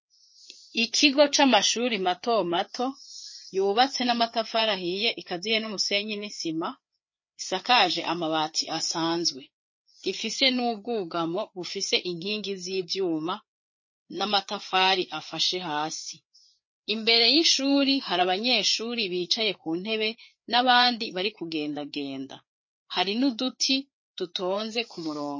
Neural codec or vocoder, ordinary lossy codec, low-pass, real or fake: codec, 16 kHz, 4 kbps, FreqCodec, larger model; MP3, 32 kbps; 7.2 kHz; fake